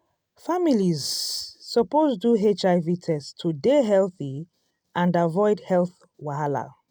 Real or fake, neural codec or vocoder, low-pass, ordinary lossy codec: real; none; none; none